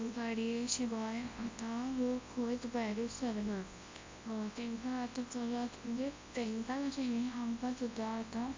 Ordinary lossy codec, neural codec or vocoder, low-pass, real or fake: MP3, 48 kbps; codec, 24 kHz, 0.9 kbps, WavTokenizer, large speech release; 7.2 kHz; fake